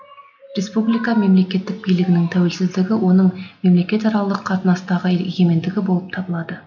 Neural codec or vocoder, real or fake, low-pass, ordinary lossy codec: none; real; 7.2 kHz; none